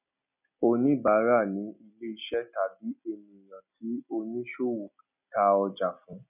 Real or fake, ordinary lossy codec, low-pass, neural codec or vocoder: real; none; 3.6 kHz; none